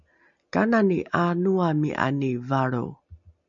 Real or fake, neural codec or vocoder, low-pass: real; none; 7.2 kHz